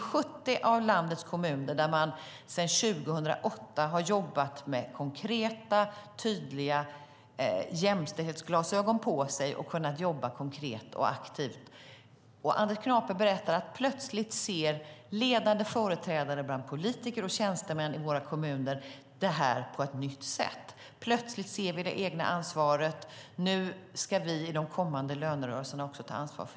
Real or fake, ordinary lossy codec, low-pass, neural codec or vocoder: real; none; none; none